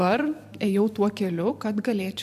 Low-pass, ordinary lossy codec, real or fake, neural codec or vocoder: 14.4 kHz; AAC, 96 kbps; fake; vocoder, 44.1 kHz, 128 mel bands every 512 samples, BigVGAN v2